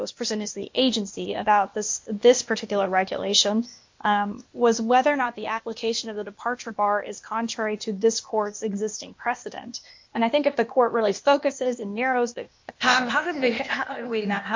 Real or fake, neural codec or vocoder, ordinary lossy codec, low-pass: fake; codec, 16 kHz, 0.8 kbps, ZipCodec; MP3, 48 kbps; 7.2 kHz